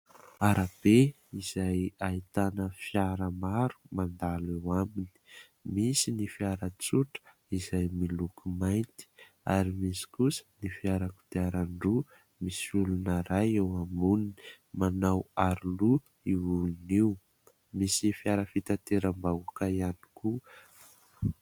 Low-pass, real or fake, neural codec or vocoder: 19.8 kHz; real; none